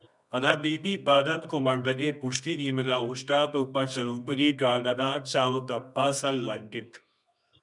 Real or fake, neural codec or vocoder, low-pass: fake; codec, 24 kHz, 0.9 kbps, WavTokenizer, medium music audio release; 10.8 kHz